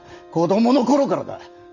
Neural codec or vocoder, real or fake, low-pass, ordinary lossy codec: none; real; 7.2 kHz; none